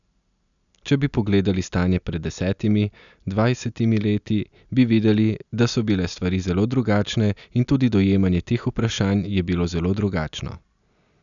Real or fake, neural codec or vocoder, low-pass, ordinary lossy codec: real; none; 7.2 kHz; none